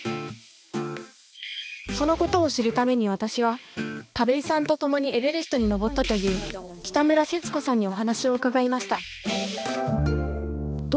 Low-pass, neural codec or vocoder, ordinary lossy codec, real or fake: none; codec, 16 kHz, 2 kbps, X-Codec, HuBERT features, trained on balanced general audio; none; fake